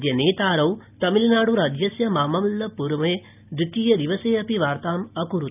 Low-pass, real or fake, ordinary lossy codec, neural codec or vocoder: 3.6 kHz; real; none; none